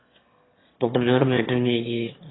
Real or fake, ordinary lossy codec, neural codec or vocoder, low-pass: fake; AAC, 16 kbps; autoencoder, 22.05 kHz, a latent of 192 numbers a frame, VITS, trained on one speaker; 7.2 kHz